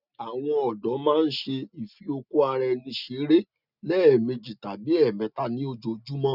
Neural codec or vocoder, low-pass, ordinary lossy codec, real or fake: none; 5.4 kHz; none; real